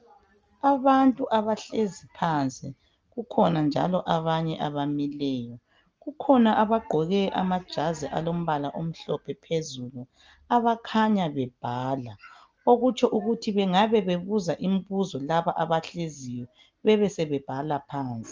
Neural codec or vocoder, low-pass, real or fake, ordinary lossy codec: none; 7.2 kHz; real; Opus, 24 kbps